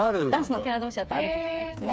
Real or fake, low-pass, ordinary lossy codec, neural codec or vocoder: fake; none; none; codec, 16 kHz, 4 kbps, FreqCodec, smaller model